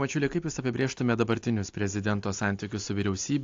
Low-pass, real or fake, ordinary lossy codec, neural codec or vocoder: 7.2 kHz; real; AAC, 48 kbps; none